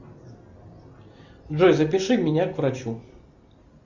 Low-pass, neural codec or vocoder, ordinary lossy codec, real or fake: 7.2 kHz; none; Opus, 64 kbps; real